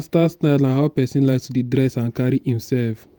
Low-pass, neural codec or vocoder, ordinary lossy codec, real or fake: none; vocoder, 48 kHz, 128 mel bands, Vocos; none; fake